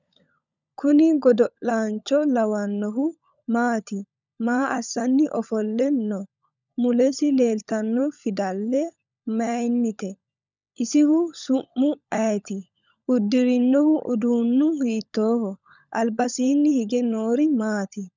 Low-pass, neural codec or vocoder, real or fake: 7.2 kHz; codec, 16 kHz, 16 kbps, FunCodec, trained on LibriTTS, 50 frames a second; fake